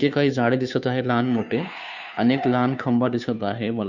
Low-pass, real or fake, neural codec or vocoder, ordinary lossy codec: 7.2 kHz; fake; codec, 16 kHz, 2 kbps, FunCodec, trained on Chinese and English, 25 frames a second; none